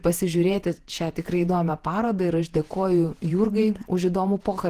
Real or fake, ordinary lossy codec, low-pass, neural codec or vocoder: fake; Opus, 16 kbps; 14.4 kHz; vocoder, 48 kHz, 128 mel bands, Vocos